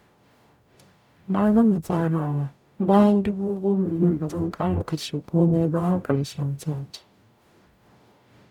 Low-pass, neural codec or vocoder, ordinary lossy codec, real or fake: 19.8 kHz; codec, 44.1 kHz, 0.9 kbps, DAC; none; fake